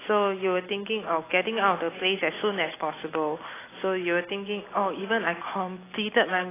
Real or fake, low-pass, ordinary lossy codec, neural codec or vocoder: real; 3.6 kHz; AAC, 16 kbps; none